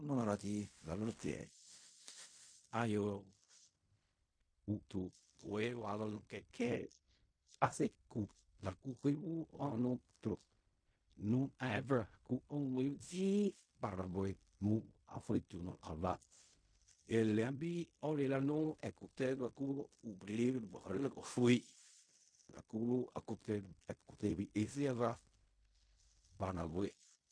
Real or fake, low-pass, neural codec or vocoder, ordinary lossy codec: fake; 9.9 kHz; codec, 16 kHz in and 24 kHz out, 0.4 kbps, LongCat-Audio-Codec, fine tuned four codebook decoder; MP3, 48 kbps